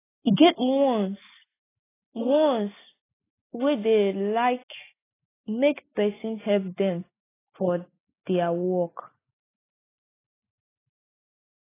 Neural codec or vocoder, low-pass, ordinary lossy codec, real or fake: none; 3.6 kHz; AAC, 16 kbps; real